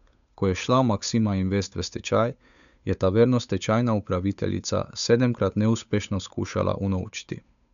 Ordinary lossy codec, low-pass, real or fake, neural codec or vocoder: none; 7.2 kHz; fake; codec, 16 kHz, 8 kbps, FunCodec, trained on Chinese and English, 25 frames a second